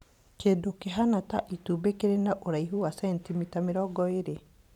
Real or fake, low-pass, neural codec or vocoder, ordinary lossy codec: fake; 19.8 kHz; vocoder, 44.1 kHz, 128 mel bands every 512 samples, BigVGAN v2; none